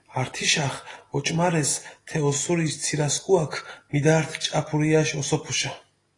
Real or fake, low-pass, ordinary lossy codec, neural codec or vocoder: real; 10.8 kHz; AAC, 32 kbps; none